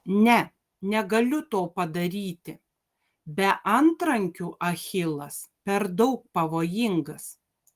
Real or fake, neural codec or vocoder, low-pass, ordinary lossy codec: real; none; 14.4 kHz; Opus, 24 kbps